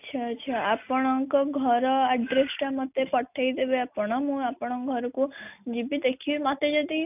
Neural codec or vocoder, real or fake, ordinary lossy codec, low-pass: none; real; none; 3.6 kHz